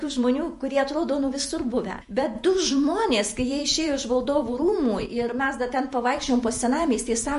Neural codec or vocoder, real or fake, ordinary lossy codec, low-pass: vocoder, 48 kHz, 128 mel bands, Vocos; fake; MP3, 48 kbps; 14.4 kHz